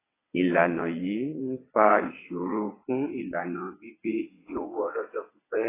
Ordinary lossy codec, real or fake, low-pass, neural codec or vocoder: AAC, 16 kbps; fake; 3.6 kHz; vocoder, 22.05 kHz, 80 mel bands, WaveNeXt